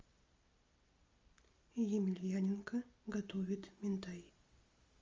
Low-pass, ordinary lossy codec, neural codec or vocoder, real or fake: 7.2 kHz; Opus, 32 kbps; none; real